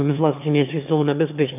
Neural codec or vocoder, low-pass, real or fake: autoencoder, 22.05 kHz, a latent of 192 numbers a frame, VITS, trained on one speaker; 3.6 kHz; fake